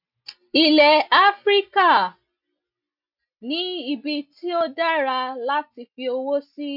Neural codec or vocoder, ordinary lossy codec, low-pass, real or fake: none; none; 5.4 kHz; real